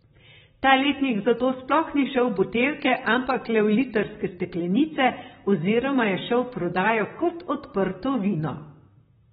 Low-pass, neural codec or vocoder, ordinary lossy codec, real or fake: 19.8 kHz; vocoder, 44.1 kHz, 128 mel bands, Pupu-Vocoder; AAC, 16 kbps; fake